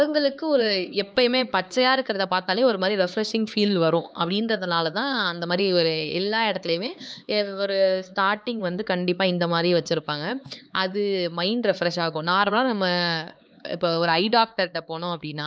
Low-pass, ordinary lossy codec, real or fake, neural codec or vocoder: none; none; fake; codec, 16 kHz, 4 kbps, X-Codec, HuBERT features, trained on LibriSpeech